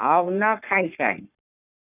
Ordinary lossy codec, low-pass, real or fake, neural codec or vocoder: none; 3.6 kHz; fake; codec, 44.1 kHz, 3.4 kbps, Pupu-Codec